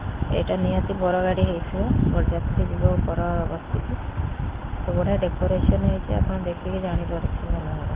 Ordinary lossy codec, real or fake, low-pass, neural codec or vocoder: Opus, 16 kbps; real; 3.6 kHz; none